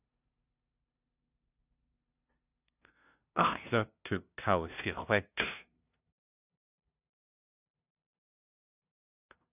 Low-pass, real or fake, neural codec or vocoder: 3.6 kHz; fake; codec, 16 kHz, 0.5 kbps, FunCodec, trained on LibriTTS, 25 frames a second